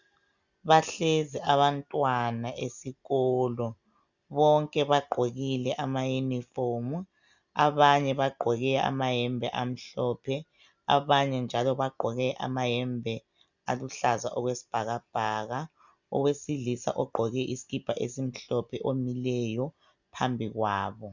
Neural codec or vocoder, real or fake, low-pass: none; real; 7.2 kHz